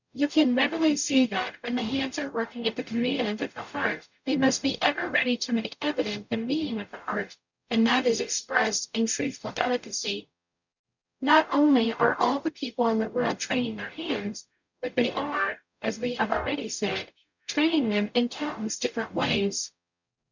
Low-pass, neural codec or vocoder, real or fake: 7.2 kHz; codec, 44.1 kHz, 0.9 kbps, DAC; fake